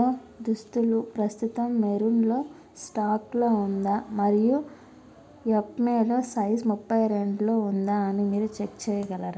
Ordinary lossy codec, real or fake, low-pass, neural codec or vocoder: none; real; none; none